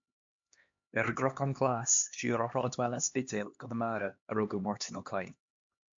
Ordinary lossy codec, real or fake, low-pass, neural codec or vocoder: MP3, 48 kbps; fake; 7.2 kHz; codec, 16 kHz, 2 kbps, X-Codec, HuBERT features, trained on LibriSpeech